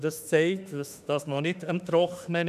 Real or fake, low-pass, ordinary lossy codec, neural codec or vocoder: fake; 14.4 kHz; none; autoencoder, 48 kHz, 32 numbers a frame, DAC-VAE, trained on Japanese speech